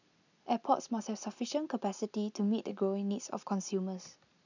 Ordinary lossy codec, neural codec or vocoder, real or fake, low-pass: none; none; real; 7.2 kHz